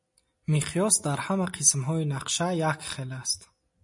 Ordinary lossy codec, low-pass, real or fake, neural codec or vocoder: MP3, 48 kbps; 10.8 kHz; real; none